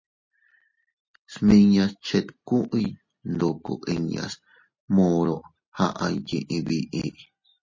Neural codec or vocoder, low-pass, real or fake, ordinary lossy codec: none; 7.2 kHz; real; MP3, 32 kbps